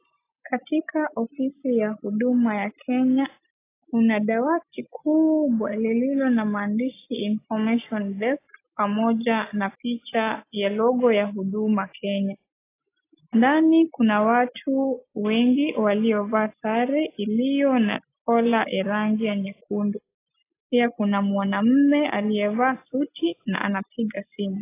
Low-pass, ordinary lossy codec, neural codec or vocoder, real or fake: 3.6 kHz; AAC, 24 kbps; none; real